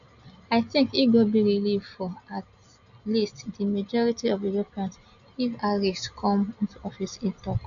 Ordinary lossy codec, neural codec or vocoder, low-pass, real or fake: AAC, 96 kbps; none; 7.2 kHz; real